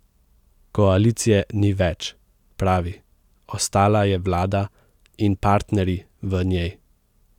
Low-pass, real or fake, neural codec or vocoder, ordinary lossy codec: 19.8 kHz; real; none; none